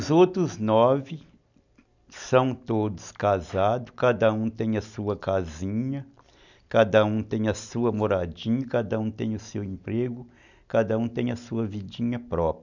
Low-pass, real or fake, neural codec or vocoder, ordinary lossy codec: 7.2 kHz; real; none; none